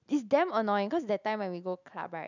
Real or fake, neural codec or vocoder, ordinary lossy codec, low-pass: real; none; none; 7.2 kHz